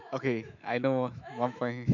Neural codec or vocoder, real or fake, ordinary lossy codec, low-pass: autoencoder, 48 kHz, 128 numbers a frame, DAC-VAE, trained on Japanese speech; fake; none; 7.2 kHz